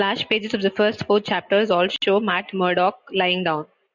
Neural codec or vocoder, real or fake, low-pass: none; real; 7.2 kHz